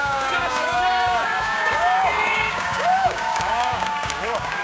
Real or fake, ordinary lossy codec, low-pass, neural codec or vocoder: fake; none; none; codec, 16 kHz, 6 kbps, DAC